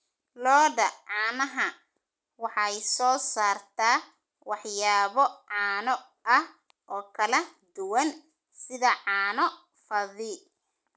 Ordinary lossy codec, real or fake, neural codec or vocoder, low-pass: none; real; none; none